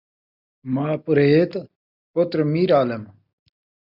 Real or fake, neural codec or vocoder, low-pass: real; none; 5.4 kHz